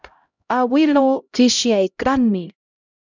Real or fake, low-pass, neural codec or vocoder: fake; 7.2 kHz; codec, 16 kHz, 0.5 kbps, X-Codec, HuBERT features, trained on LibriSpeech